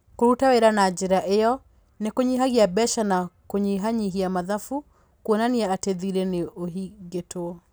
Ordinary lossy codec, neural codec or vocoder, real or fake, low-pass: none; none; real; none